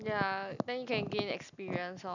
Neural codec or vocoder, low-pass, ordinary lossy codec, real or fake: none; 7.2 kHz; none; real